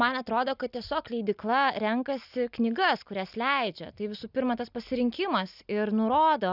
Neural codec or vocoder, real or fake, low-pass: none; real; 5.4 kHz